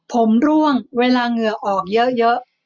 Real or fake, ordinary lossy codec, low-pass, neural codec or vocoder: real; none; 7.2 kHz; none